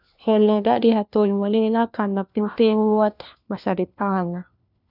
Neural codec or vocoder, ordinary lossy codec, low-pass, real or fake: codec, 16 kHz, 1 kbps, FunCodec, trained on LibriTTS, 50 frames a second; none; 5.4 kHz; fake